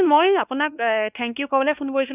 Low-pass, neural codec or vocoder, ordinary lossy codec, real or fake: 3.6 kHz; codec, 16 kHz, 2 kbps, X-Codec, WavLM features, trained on Multilingual LibriSpeech; none; fake